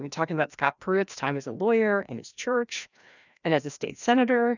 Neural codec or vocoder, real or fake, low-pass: codec, 16 kHz, 1 kbps, FreqCodec, larger model; fake; 7.2 kHz